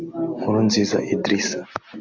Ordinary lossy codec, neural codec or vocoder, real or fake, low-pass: AAC, 48 kbps; none; real; 7.2 kHz